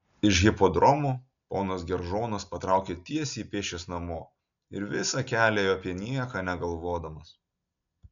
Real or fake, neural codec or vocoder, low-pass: real; none; 7.2 kHz